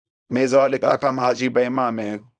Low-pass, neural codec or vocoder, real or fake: 9.9 kHz; codec, 24 kHz, 0.9 kbps, WavTokenizer, small release; fake